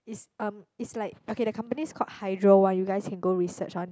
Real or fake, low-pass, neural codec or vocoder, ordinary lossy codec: real; none; none; none